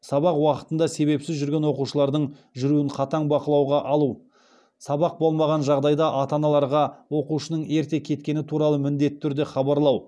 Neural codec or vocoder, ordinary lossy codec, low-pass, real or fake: none; none; none; real